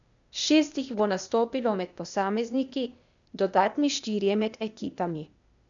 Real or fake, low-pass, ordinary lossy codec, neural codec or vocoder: fake; 7.2 kHz; none; codec, 16 kHz, 0.8 kbps, ZipCodec